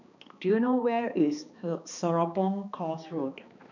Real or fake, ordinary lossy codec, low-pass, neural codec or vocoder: fake; none; 7.2 kHz; codec, 16 kHz, 2 kbps, X-Codec, HuBERT features, trained on balanced general audio